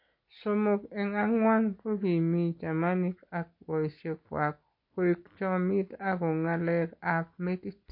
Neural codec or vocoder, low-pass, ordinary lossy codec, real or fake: none; 5.4 kHz; MP3, 32 kbps; real